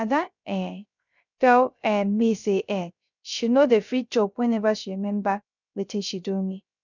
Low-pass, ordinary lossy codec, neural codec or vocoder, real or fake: 7.2 kHz; none; codec, 16 kHz, 0.3 kbps, FocalCodec; fake